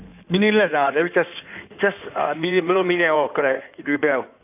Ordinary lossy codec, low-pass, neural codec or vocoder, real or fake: AAC, 32 kbps; 3.6 kHz; codec, 16 kHz in and 24 kHz out, 2.2 kbps, FireRedTTS-2 codec; fake